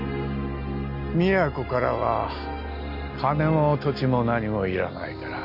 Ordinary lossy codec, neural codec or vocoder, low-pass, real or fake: none; none; 5.4 kHz; real